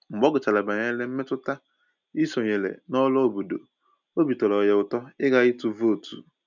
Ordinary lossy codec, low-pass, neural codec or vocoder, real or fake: none; 7.2 kHz; none; real